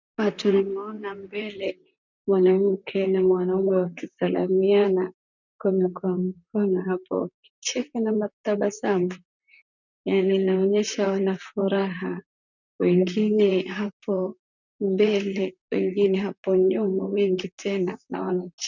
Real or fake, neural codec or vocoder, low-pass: fake; vocoder, 44.1 kHz, 128 mel bands, Pupu-Vocoder; 7.2 kHz